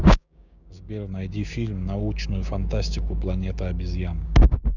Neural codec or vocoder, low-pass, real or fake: codec, 16 kHz, 6 kbps, DAC; 7.2 kHz; fake